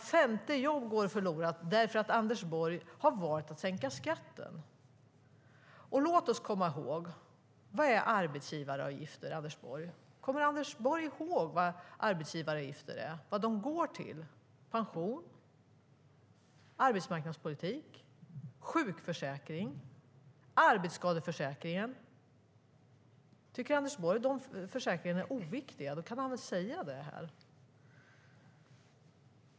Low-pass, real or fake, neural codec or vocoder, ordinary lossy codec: none; real; none; none